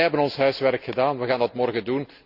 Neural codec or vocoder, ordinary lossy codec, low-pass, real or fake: none; Opus, 64 kbps; 5.4 kHz; real